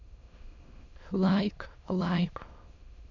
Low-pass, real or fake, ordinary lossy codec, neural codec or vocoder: 7.2 kHz; fake; none; autoencoder, 22.05 kHz, a latent of 192 numbers a frame, VITS, trained on many speakers